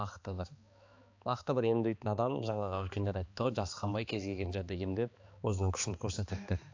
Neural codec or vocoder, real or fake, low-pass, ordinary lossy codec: codec, 16 kHz, 2 kbps, X-Codec, HuBERT features, trained on balanced general audio; fake; 7.2 kHz; AAC, 48 kbps